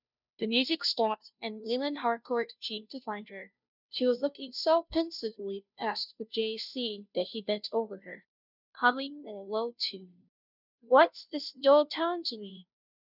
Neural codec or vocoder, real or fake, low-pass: codec, 16 kHz, 0.5 kbps, FunCodec, trained on Chinese and English, 25 frames a second; fake; 5.4 kHz